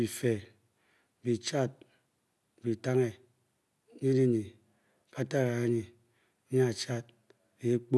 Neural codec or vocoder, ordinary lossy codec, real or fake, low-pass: none; none; real; none